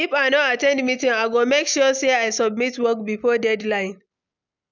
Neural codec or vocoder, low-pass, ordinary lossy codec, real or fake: none; 7.2 kHz; none; real